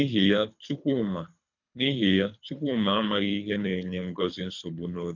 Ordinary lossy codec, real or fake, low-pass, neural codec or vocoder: none; fake; 7.2 kHz; codec, 24 kHz, 3 kbps, HILCodec